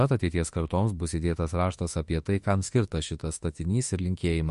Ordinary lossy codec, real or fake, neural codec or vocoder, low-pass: MP3, 48 kbps; fake; autoencoder, 48 kHz, 32 numbers a frame, DAC-VAE, trained on Japanese speech; 14.4 kHz